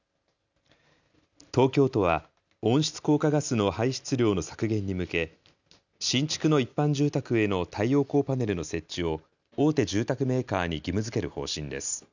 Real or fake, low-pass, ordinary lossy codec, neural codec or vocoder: real; 7.2 kHz; none; none